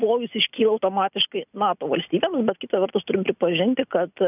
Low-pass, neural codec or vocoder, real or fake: 3.6 kHz; none; real